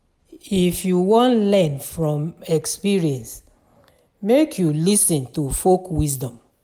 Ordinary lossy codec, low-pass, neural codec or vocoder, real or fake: none; none; none; real